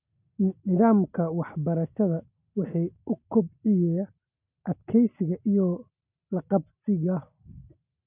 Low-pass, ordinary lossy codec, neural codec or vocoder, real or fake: 3.6 kHz; none; none; real